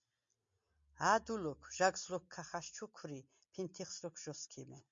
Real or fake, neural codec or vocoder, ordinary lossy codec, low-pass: real; none; MP3, 48 kbps; 7.2 kHz